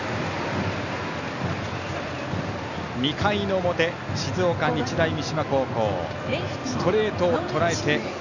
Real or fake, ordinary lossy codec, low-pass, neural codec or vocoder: real; none; 7.2 kHz; none